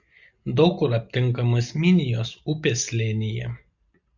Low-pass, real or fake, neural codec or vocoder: 7.2 kHz; real; none